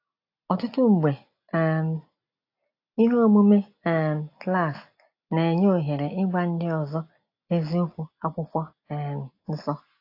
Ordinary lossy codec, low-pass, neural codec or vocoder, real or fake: AAC, 32 kbps; 5.4 kHz; none; real